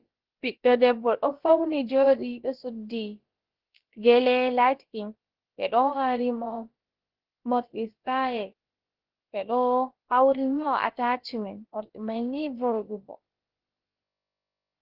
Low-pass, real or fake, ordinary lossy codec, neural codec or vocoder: 5.4 kHz; fake; Opus, 16 kbps; codec, 16 kHz, about 1 kbps, DyCAST, with the encoder's durations